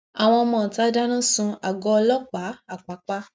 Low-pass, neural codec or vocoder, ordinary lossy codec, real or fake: none; none; none; real